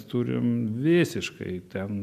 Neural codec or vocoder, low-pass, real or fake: none; 14.4 kHz; real